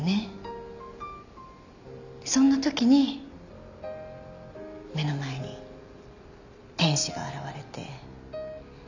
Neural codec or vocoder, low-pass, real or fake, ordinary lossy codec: none; 7.2 kHz; real; none